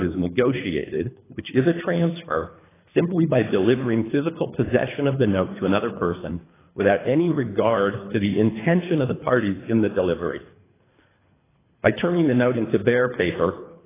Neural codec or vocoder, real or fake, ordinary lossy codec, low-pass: codec, 24 kHz, 3 kbps, HILCodec; fake; AAC, 16 kbps; 3.6 kHz